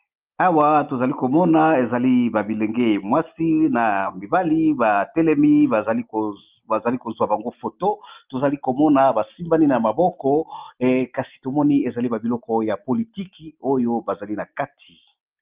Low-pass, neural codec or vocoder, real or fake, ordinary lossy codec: 3.6 kHz; none; real; Opus, 32 kbps